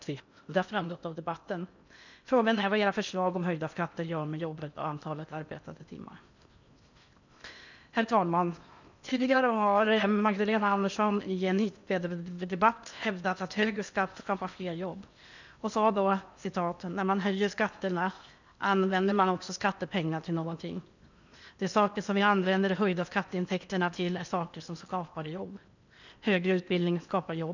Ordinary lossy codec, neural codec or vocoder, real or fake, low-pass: none; codec, 16 kHz in and 24 kHz out, 0.8 kbps, FocalCodec, streaming, 65536 codes; fake; 7.2 kHz